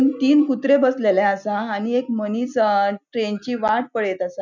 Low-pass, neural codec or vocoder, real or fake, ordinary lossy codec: 7.2 kHz; none; real; none